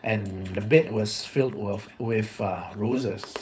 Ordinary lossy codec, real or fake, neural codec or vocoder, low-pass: none; fake; codec, 16 kHz, 4.8 kbps, FACodec; none